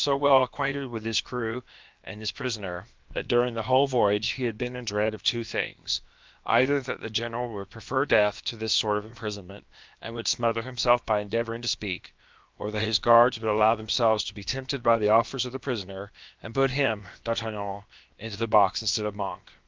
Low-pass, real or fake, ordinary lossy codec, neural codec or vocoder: 7.2 kHz; fake; Opus, 24 kbps; codec, 16 kHz, about 1 kbps, DyCAST, with the encoder's durations